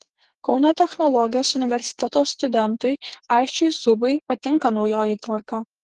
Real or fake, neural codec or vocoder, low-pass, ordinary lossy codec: fake; codec, 44.1 kHz, 2.6 kbps, SNAC; 10.8 kHz; Opus, 16 kbps